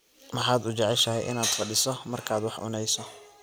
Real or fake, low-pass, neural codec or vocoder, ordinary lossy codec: real; none; none; none